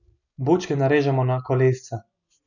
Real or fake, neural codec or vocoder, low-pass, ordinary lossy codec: real; none; 7.2 kHz; none